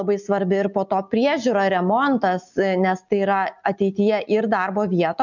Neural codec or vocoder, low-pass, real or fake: none; 7.2 kHz; real